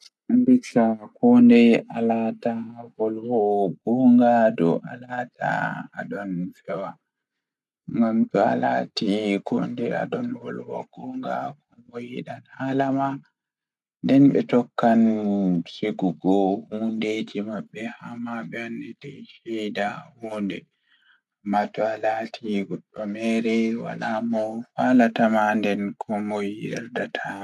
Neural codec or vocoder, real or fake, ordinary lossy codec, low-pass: none; real; none; none